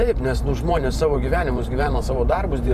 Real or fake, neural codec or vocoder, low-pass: fake; vocoder, 44.1 kHz, 128 mel bands every 512 samples, BigVGAN v2; 14.4 kHz